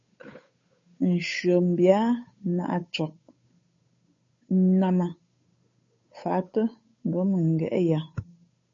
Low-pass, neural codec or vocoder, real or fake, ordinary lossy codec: 7.2 kHz; codec, 16 kHz, 8 kbps, FunCodec, trained on Chinese and English, 25 frames a second; fake; MP3, 32 kbps